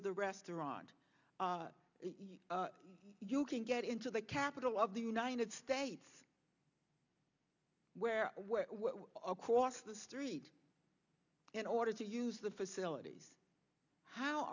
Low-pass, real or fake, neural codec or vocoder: 7.2 kHz; real; none